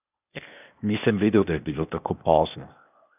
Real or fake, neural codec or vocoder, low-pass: fake; codec, 16 kHz, 0.8 kbps, ZipCodec; 3.6 kHz